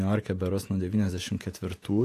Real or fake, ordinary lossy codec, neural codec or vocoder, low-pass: fake; AAC, 64 kbps; vocoder, 48 kHz, 128 mel bands, Vocos; 14.4 kHz